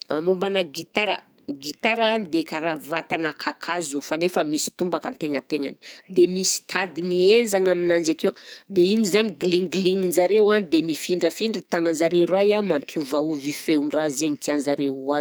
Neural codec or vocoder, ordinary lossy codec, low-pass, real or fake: codec, 44.1 kHz, 2.6 kbps, SNAC; none; none; fake